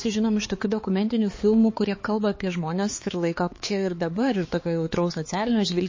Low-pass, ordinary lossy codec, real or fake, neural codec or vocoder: 7.2 kHz; MP3, 32 kbps; fake; codec, 16 kHz, 4 kbps, X-Codec, HuBERT features, trained on balanced general audio